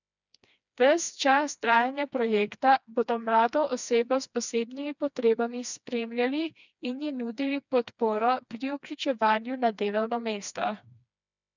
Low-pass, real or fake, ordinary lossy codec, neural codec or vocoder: 7.2 kHz; fake; none; codec, 16 kHz, 2 kbps, FreqCodec, smaller model